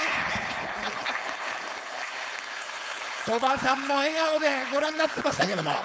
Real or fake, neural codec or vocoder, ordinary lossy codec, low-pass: fake; codec, 16 kHz, 4.8 kbps, FACodec; none; none